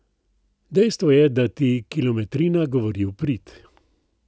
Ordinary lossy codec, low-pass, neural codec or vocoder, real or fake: none; none; none; real